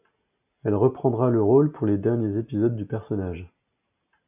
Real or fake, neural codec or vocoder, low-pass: real; none; 3.6 kHz